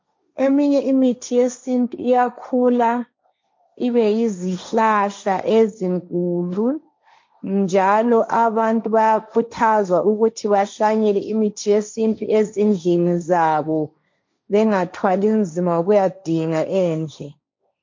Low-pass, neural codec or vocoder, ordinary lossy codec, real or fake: 7.2 kHz; codec, 16 kHz, 1.1 kbps, Voila-Tokenizer; MP3, 48 kbps; fake